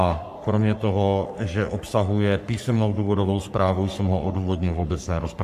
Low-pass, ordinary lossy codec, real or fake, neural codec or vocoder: 14.4 kHz; AAC, 96 kbps; fake; codec, 44.1 kHz, 3.4 kbps, Pupu-Codec